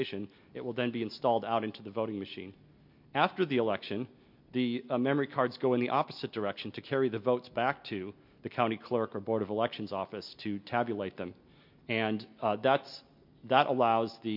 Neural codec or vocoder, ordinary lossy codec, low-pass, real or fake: none; MP3, 48 kbps; 5.4 kHz; real